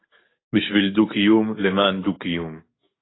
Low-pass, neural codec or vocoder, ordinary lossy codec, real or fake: 7.2 kHz; codec, 44.1 kHz, 7.8 kbps, DAC; AAC, 16 kbps; fake